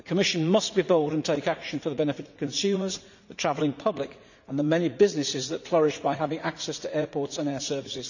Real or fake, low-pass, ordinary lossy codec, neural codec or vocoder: fake; 7.2 kHz; none; vocoder, 44.1 kHz, 80 mel bands, Vocos